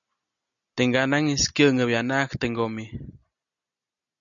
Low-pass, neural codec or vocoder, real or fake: 7.2 kHz; none; real